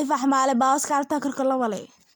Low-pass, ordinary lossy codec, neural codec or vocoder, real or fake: none; none; none; real